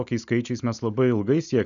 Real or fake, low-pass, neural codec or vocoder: real; 7.2 kHz; none